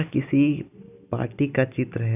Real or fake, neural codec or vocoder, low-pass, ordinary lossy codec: real; none; 3.6 kHz; none